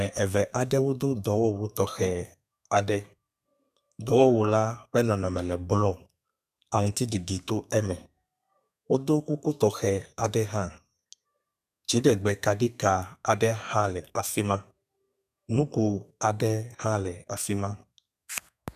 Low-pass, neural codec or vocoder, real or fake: 14.4 kHz; codec, 32 kHz, 1.9 kbps, SNAC; fake